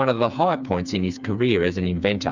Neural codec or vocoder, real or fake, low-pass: codec, 16 kHz, 4 kbps, FreqCodec, smaller model; fake; 7.2 kHz